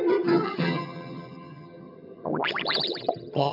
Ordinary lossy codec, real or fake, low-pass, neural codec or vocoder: AAC, 48 kbps; fake; 5.4 kHz; vocoder, 22.05 kHz, 80 mel bands, HiFi-GAN